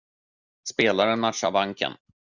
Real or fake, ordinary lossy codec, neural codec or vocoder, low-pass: real; Opus, 64 kbps; none; 7.2 kHz